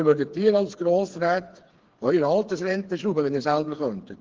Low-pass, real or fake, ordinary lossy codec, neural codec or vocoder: 7.2 kHz; fake; Opus, 16 kbps; codec, 16 kHz, 4 kbps, FreqCodec, smaller model